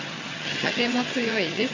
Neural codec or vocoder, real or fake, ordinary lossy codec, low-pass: vocoder, 22.05 kHz, 80 mel bands, HiFi-GAN; fake; AAC, 32 kbps; 7.2 kHz